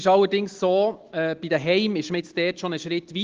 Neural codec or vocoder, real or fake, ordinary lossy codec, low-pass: none; real; Opus, 16 kbps; 7.2 kHz